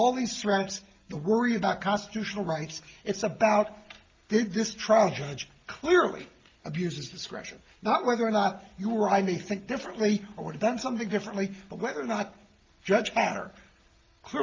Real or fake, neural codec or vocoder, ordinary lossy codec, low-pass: real; none; Opus, 32 kbps; 7.2 kHz